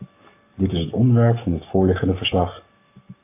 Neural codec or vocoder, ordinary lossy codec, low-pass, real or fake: codec, 44.1 kHz, 7.8 kbps, Pupu-Codec; AAC, 24 kbps; 3.6 kHz; fake